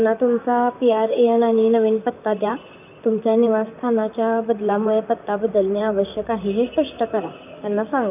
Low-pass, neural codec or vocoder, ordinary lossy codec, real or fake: 3.6 kHz; vocoder, 44.1 kHz, 128 mel bands, Pupu-Vocoder; none; fake